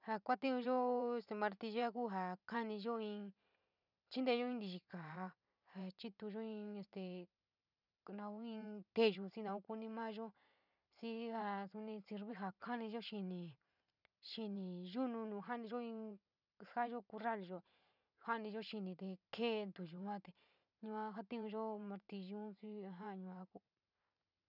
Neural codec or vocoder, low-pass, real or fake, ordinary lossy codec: vocoder, 44.1 kHz, 128 mel bands every 512 samples, BigVGAN v2; 5.4 kHz; fake; none